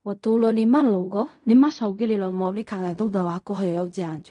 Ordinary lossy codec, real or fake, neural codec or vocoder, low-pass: MP3, 64 kbps; fake; codec, 16 kHz in and 24 kHz out, 0.4 kbps, LongCat-Audio-Codec, fine tuned four codebook decoder; 10.8 kHz